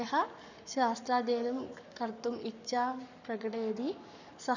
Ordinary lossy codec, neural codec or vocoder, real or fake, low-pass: none; codec, 44.1 kHz, 7.8 kbps, Pupu-Codec; fake; 7.2 kHz